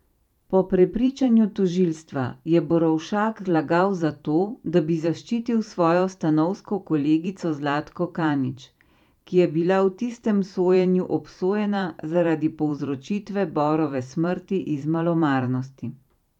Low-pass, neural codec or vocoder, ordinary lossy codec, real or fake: 19.8 kHz; vocoder, 44.1 kHz, 128 mel bands every 512 samples, BigVGAN v2; none; fake